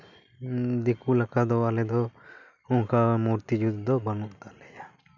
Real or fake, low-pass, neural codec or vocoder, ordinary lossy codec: real; 7.2 kHz; none; none